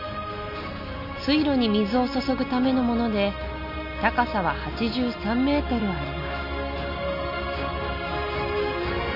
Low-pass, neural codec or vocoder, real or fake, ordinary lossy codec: 5.4 kHz; none; real; none